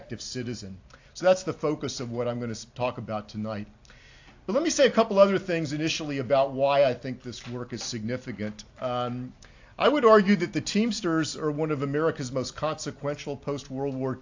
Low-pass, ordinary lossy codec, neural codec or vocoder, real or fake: 7.2 kHz; AAC, 48 kbps; none; real